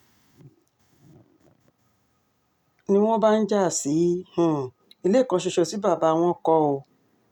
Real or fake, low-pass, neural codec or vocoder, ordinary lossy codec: real; 19.8 kHz; none; none